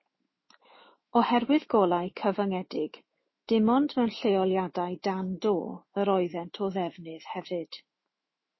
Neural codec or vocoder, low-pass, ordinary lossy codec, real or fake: autoencoder, 48 kHz, 128 numbers a frame, DAC-VAE, trained on Japanese speech; 7.2 kHz; MP3, 24 kbps; fake